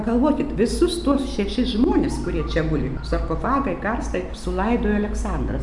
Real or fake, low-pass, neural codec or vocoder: real; 10.8 kHz; none